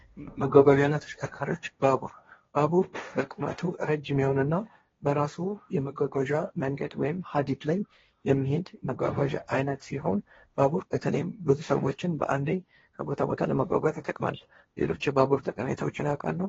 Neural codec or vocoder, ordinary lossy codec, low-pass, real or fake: codec, 16 kHz, 1.1 kbps, Voila-Tokenizer; AAC, 24 kbps; 7.2 kHz; fake